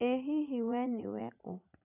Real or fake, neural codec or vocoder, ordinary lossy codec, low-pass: fake; vocoder, 44.1 kHz, 80 mel bands, Vocos; none; 3.6 kHz